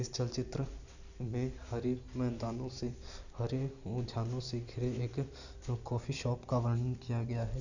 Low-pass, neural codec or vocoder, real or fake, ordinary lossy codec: 7.2 kHz; none; real; none